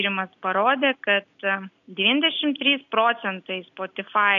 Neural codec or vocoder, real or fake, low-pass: none; real; 7.2 kHz